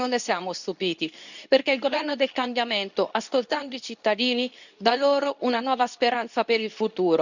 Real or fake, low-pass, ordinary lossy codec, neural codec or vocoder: fake; 7.2 kHz; none; codec, 24 kHz, 0.9 kbps, WavTokenizer, medium speech release version 2